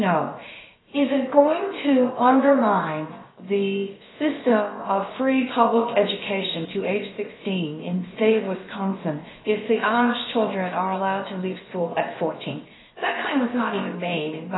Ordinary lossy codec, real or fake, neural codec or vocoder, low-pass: AAC, 16 kbps; fake; codec, 16 kHz, about 1 kbps, DyCAST, with the encoder's durations; 7.2 kHz